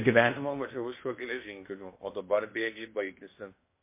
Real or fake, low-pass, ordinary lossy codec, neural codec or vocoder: fake; 3.6 kHz; MP3, 24 kbps; codec, 16 kHz in and 24 kHz out, 0.8 kbps, FocalCodec, streaming, 65536 codes